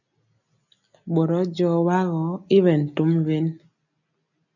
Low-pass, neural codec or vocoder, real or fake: 7.2 kHz; none; real